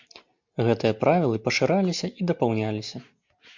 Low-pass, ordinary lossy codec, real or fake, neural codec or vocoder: 7.2 kHz; MP3, 64 kbps; real; none